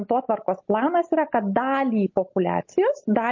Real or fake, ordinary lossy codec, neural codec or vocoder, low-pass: real; MP3, 32 kbps; none; 7.2 kHz